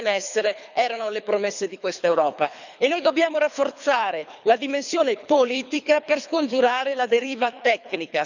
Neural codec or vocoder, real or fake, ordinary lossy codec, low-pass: codec, 24 kHz, 3 kbps, HILCodec; fake; none; 7.2 kHz